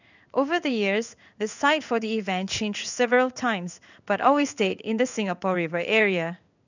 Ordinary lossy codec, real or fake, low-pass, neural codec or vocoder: none; fake; 7.2 kHz; codec, 16 kHz in and 24 kHz out, 1 kbps, XY-Tokenizer